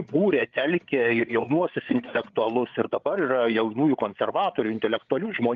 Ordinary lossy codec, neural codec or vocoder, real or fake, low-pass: Opus, 32 kbps; codec, 16 kHz, 8 kbps, FreqCodec, larger model; fake; 7.2 kHz